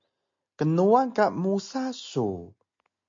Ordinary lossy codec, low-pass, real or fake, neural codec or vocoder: MP3, 48 kbps; 7.2 kHz; real; none